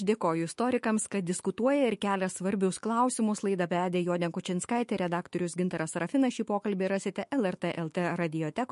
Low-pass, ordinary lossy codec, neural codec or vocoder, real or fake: 14.4 kHz; MP3, 48 kbps; autoencoder, 48 kHz, 128 numbers a frame, DAC-VAE, trained on Japanese speech; fake